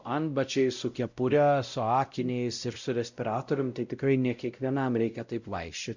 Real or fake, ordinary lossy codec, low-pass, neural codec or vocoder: fake; Opus, 64 kbps; 7.2 kHz; codec, 16 kHz, 0.5 kbps, X-Codec, WavLM features, trained on Multilingual LibriSpeech